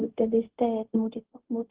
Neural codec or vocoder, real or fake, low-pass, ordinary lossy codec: codec, 16 kHz, 0.4 kbps, LongCat-Audio-Codec; fake; 3.6 kHz; Opus, 16 kbps